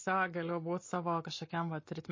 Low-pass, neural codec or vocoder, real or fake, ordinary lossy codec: 7.2 kHz; none; real; MP3, 32 kbps